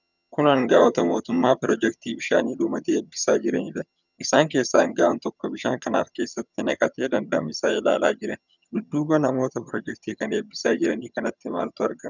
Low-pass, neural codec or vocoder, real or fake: 7.2 kHz; vocoder, 22.05 kHz, 80 mel bands, HiFi-GAN; fake